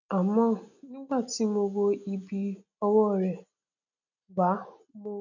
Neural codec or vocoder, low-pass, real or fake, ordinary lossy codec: none; 7.2 kHz; real; none